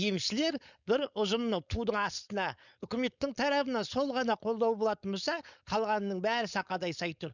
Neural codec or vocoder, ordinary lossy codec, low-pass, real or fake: codec, 16 kHz, 4.8 kbps, FACodec; none; 7.2 kHz; fake